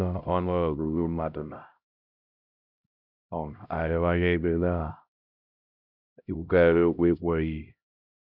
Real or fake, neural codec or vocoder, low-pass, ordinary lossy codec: fake; codec, 16 kHz, 0.5 kbps, X-Codec, HuBERT features, trained on LibriSpeech; 5.4 kHz; none